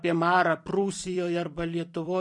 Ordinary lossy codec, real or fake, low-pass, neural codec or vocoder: MP3, 48 kbps; fake; 10.8 kHz; vocoder, 44.1 kHz, 128 mel bands every 256 samples, BigVGAN v2